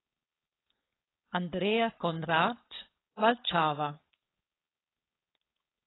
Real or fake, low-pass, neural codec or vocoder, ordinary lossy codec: fake; 7.2 kHz; codec, 16 kHz, 4.8 kbps, FACodec; AAC, 16 kbps